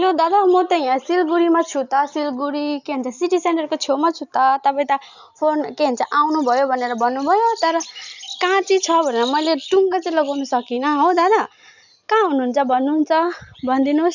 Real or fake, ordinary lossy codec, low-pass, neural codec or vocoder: real; none; 7.2 kHz; none